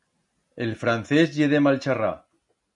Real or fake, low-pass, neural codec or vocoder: real; 10.8 kHz; none